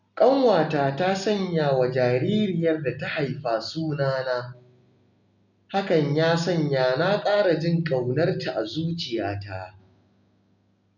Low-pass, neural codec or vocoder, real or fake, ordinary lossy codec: 7.2 kHz; none; real; none